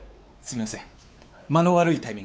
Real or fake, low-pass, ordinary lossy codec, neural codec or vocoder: fake; none; none; codec, 16 kHz, 4 kbps, X-Codec, WavLM features, trained on Multilingual LibriSpeech